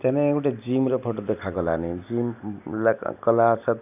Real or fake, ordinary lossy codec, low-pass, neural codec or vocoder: real; none; 3.6 kHz; none